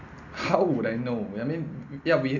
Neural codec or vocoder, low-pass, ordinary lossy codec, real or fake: none; 7.2 kHz; none; real